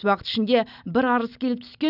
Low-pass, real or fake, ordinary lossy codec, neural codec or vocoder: 5.4 kHz; real; none; none